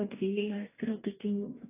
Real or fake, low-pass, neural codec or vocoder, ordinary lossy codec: fake; 3.6 kHz; codec, 44.1 kHz, 2.6 kbps, DAC; AAC, 16 kbps